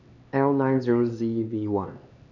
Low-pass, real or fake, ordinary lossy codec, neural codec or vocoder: 7.2 kHz; fake; none; codec, 16 kHz, 2 kbps, X-Codec, HuBERT features, trained on LibriSpeech